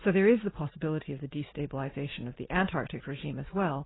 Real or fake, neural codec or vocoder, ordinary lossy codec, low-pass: real; none; AAC, 16 kbps; 7.2 kHz